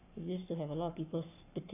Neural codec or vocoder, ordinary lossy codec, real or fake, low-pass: codec, 44.1 kHz, 7.8 kbps, Pupu-Codec; none; fake; 3.6 kHz